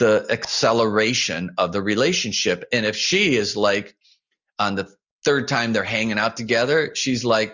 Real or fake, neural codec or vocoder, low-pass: real; none; 7.2 kHz